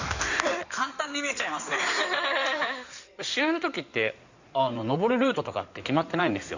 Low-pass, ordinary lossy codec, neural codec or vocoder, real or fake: 7.2 kHz; Opus, 64 kbps; codec, 16 kHz in and 24 kHz out, 2.2 kbps, FireRedTTS-2 codec; fake